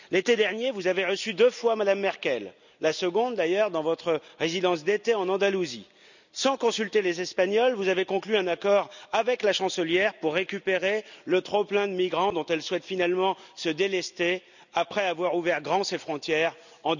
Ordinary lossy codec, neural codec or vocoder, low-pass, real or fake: none; none; 7.2 kHz; real